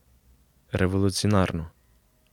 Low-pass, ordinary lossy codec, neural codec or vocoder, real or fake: 19.8 kHz; none; none; real